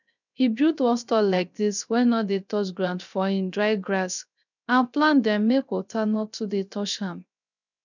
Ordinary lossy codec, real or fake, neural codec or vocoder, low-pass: none; fake; codec, 16 kHz, 0.3 kbps, FocalCodec; 7.2 kHz